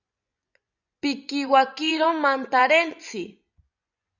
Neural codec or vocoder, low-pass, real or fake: vocoder, 24 kHz, 100 mel bands, Vocos; 7.2 kHz; fake